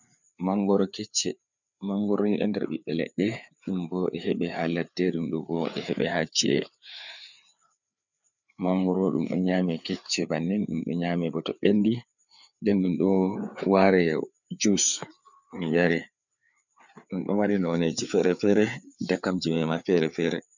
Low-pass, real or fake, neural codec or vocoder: 7.2 kHz; fake; codec, 16 kHz, 4 kbps, FreqCodec, larger model